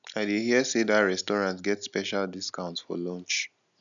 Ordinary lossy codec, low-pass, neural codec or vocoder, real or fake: none; 7.2 kHz; none; real